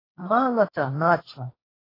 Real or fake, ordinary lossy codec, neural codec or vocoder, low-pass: fake; AAC, 24 kbps; codec, 16 kHz, 1.1 kbps, Voila-Tokenizer; 5.4 kHz